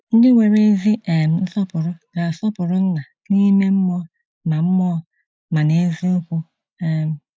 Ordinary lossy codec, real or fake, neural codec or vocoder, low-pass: none; real; none; none